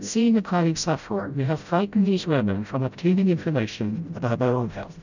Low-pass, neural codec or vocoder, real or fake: 7.2 kHz; codec, 16 kHz, 0.5 kbps, FreqCodec, smaller model; fake